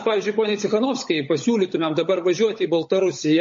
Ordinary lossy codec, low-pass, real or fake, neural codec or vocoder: MP3, 32 kbps; 7.2 kHz; fake; codec, 16 kHz, 16 kbps, FunCodec, trained on LibriTTS, 50 frames a second